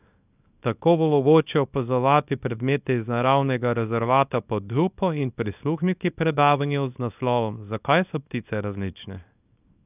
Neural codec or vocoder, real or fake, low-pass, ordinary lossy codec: codec, 24 kHz, 0.9 kbps, WavTokenizer, small release; fake; 3.6 kHz; none